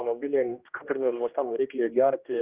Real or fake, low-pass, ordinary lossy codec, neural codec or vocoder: fake; 3.6 kHz; Opus, 32 kbps; codec, 16 kHz, 1 kbps, X-Codec, HuBERT features, trained on general audio